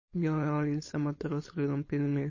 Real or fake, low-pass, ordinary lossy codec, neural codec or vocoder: fake; 7.2 kHz; MP3, 32 kbps; codec, 16 kHz, 4.8 kbps, FACodec